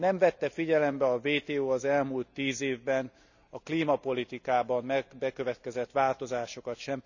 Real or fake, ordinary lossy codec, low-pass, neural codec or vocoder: real; none; 7.2 kHz; none